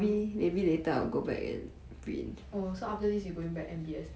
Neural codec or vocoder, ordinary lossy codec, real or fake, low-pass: none; none; real; none